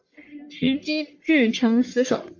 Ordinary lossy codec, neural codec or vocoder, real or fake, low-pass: MP3, 48 kbps; codec, 44.1 kHz, 1.7 kbps, Pupu-Codec; fake; 7.2 kHz